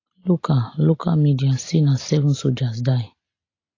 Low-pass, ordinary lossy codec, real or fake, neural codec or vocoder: 7.2 kHz; AAC, 32 kbps; real; none